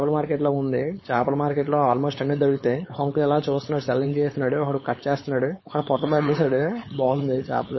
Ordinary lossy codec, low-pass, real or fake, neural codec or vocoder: MP3, 24 kbps; 7.2 kHz; fake; codec, 16 kHz, 4.8 kbps, FACodec